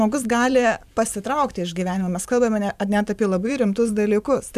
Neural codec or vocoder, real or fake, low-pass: vocoder, 44.1 kHz, 128 mel bands every 512 samples, BigVGAN v2; fake; 14.4 kHz